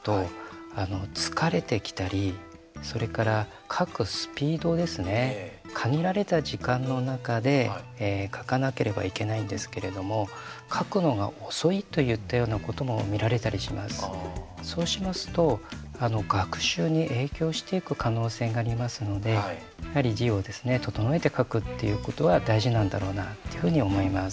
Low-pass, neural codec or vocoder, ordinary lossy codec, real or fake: none; none; none; real